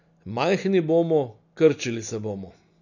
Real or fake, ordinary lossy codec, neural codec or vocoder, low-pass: real; none; none; 7.2 kHz